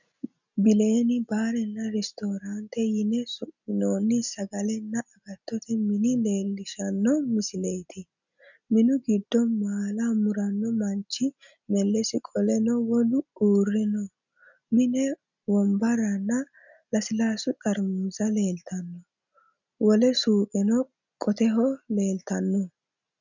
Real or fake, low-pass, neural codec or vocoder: real; 7.2 kHz; none